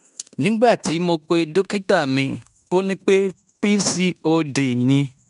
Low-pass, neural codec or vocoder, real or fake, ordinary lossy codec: 10.8 kHz; codec, 16 kHz in and 24 kHz out, 0.9 kbps, LongCat-Audio-Codec, fine tuned four codebook decoder; fake; none